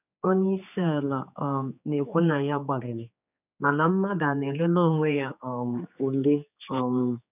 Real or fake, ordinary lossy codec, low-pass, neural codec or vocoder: fake; none; 3.6 kHz; codec, 16 kHz, 4 kbps, X-Codec, HuBERT features, trained on general audio